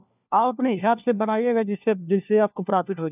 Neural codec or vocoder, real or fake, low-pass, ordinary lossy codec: codec, 16 kHz, 1 kbps, FunCodec, trained on LibriTTS, 50 frames a second; fake; 3.6 kHz; none